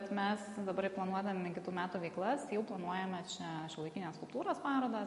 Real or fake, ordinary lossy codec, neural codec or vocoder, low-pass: real; MP3, 48 kbps; none; 14.4 kHz